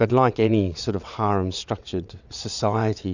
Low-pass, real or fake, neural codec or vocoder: 7.2 kHz; fake; vocoder, 22.05 kHz, 80 mel bands, WaveNeXt